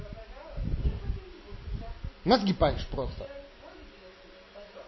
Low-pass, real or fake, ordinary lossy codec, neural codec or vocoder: 7.2 kHz; real; MP3, 24 kbps; none